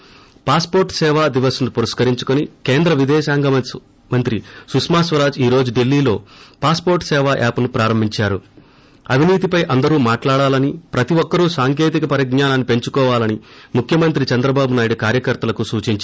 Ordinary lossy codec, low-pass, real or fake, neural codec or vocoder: none; none; real; none